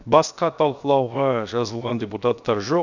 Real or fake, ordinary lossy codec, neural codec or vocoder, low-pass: fake; none; codec, 16 kHz, 0.7 kbps, FocalCodec; 7.2 kHz